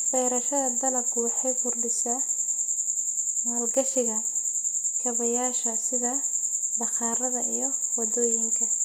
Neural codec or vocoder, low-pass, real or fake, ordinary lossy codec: none; none; real; none